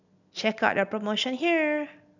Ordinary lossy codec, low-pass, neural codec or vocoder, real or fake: none; 7.2 kHz; none; real